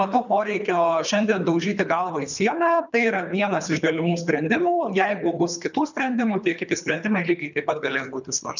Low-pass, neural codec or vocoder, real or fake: 7.2 kHz; codec, 24 kHz, 3 kbps, HILCodec; fake